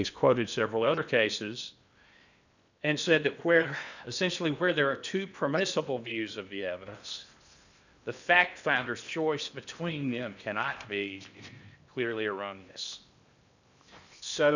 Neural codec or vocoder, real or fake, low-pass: codec, 16 kHz in and 24 kHz out, 0.8 kbps, FocalCodec, streaming, 65536 codes; fake; 7.2 kHz